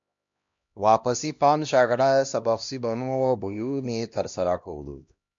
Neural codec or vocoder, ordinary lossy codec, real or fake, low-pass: codec, 16 kHz, 1 kbps, X-Codec, HuBERT features, trained on LibriSpeech; MP3, 64 kbps; fake; 7.2 kHz